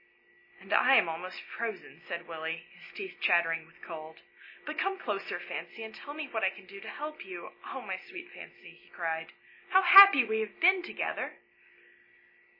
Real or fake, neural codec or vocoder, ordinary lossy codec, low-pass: real; none; MP3, 24 kbps; 5.4 kHz